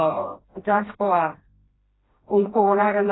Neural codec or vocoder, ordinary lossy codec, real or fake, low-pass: codec, 16 kHz, 1 kbps, FreqCodec, smaller model; AAC, 16 kbps; fake; 7.2 kHz